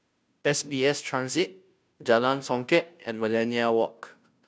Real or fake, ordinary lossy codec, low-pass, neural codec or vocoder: fake; none; none; codec, 16 kHz, 0.5 kbps, FunCodec, trained on Chinese and English, 25 frames a second